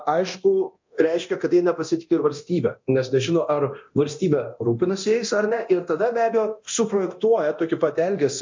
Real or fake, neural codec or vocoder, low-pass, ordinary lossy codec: fake; codec, 24 kHz, 0.9 kbps, DualCodec; 7.2 kHz; MP3, 48 kbps